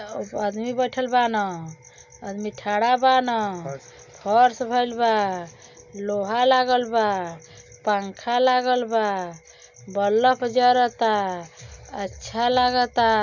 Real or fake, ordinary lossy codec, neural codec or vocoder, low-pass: real; none; none; 7.2 kHz